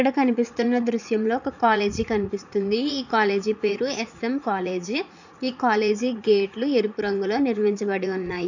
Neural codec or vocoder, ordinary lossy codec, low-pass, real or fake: vocoder, 44.1 kHz, 80 mel bands, Vocos; none; 7.2 kHz; fake